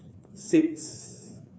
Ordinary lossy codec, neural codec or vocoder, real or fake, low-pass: none; codec, 16 kHz, 2 kbps, FreqCodec, larger model; fake; none